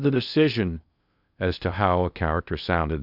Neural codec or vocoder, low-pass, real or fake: codec, 16 kHz in and 24 kHz out, 0.6 kbps, FocalCodec, streaming, 2048 codes; 5.4 kHz; fake